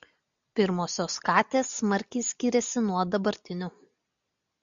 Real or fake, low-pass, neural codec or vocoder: real; 7.2 kHz; none